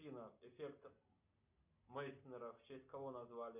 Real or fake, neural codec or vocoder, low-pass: real; none; 3.6 kHz